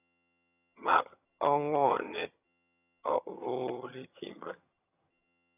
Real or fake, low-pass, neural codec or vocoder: fake; 3.6 kHz; vocoder, 22.05 kHz, 80 mel bands, HiFi-GAN